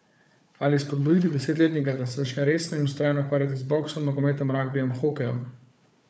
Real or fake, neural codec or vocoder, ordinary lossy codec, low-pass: fake; codec, 16 kHz, 4 kbps, FunCodec, trained on Chinese and English, 50 frames a second; none; none